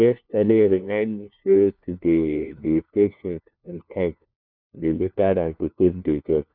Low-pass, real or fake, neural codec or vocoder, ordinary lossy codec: 5.4 kHz; fake; codec, 16 kHz, 1 kbps, FunCodec, trained on LibriTTS, 50 frames a second; none